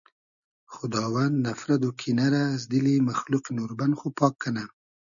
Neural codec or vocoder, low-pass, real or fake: none; 7.2 kHz; real